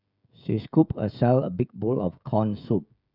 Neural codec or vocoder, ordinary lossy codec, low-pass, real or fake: codec, 16 kHz, 16 kbps, FreqCodec, smaller model; none; 5.4 kHz; fake